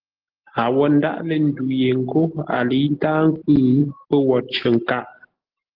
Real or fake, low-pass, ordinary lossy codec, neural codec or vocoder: real; 5.4 kHz; Opus, 16 kbps; none